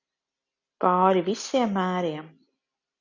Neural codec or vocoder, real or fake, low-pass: none; real; 7.2 kHz